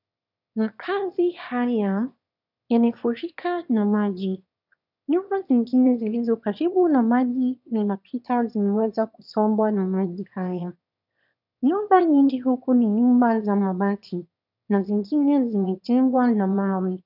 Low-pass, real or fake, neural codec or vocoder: 5.4 kHz; fake; autoencoder, 22.05 kHz, a latent of 192 numbers a frame, VITS, trained on one speaker